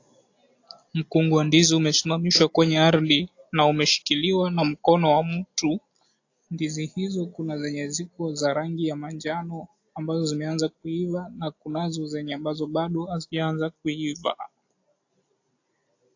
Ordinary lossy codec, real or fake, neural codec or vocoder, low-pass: AAC, 48 kbps; real; none; 7.2 kHz